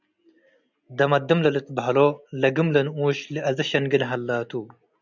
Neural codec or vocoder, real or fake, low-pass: none; real; 7.2 kHz